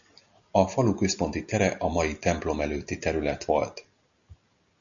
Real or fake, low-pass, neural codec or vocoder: real; 7.2 kHz; none